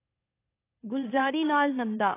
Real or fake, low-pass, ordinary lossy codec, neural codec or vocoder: fake; 3.6 kHz; AAC, 24 kbps; autoencoder, 44.1 kHz, a latent of 192 numbers a frame, MeloTTS